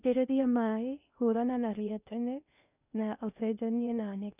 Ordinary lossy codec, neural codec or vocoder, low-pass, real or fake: none; codec, 16 kHz in and 24 kHz out, 0.6 kbps, FocalCodec, streaming, 2048 codes; 3.6 kHz; fake